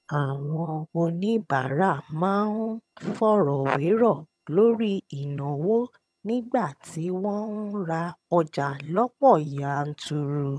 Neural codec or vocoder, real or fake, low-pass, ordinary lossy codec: vocoder, 22.05 kHz, 80 mel bands, HiFi-GAN; fake; none; none